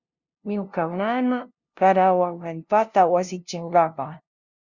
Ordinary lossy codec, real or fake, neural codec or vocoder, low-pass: Opus, 64 kbps; fake; codec, 16 kHz, 0.5 kbps, FunCodec, trained on LibriTTS, 25 frames a second; 7.2 kHz